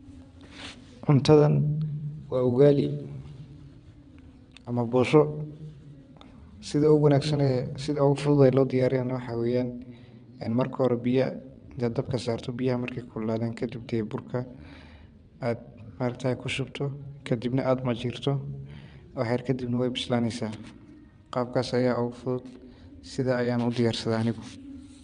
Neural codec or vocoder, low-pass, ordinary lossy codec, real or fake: vocoder, 22.05 kHz, 80 mel bands, WaveNeXt; 9.9 kHz; none; fake